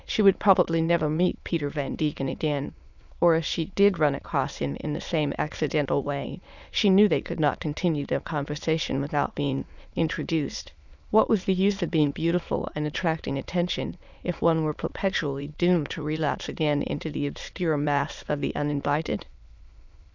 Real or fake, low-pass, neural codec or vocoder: fake; 7.2 kHz; autoencoder, 22.05 kHz, a latent of 192 numbers a frame, VITS, trained on many speakers